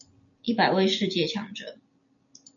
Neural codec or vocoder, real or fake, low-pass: none; real; 7.2 kHz